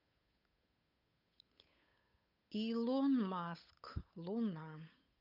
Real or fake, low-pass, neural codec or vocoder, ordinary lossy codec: fake; 5.4 kHz; codec, 16 kHz, 8 kbps, FunCodec, trained on Chinese and English, 25 frames a second; none